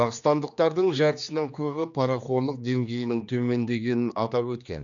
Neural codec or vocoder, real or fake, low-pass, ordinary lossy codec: codec, 16 kHz, 2 kbps, X-Codec, HuBERT features, trained on general audio; fake; 7.2 kHz; none